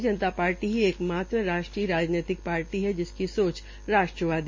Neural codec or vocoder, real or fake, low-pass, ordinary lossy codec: none; real; 7.2 kHz; MP3, 32 kbps